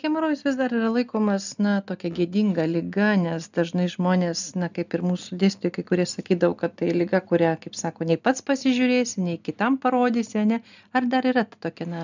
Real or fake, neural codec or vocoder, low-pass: real; none; 7.2 kHz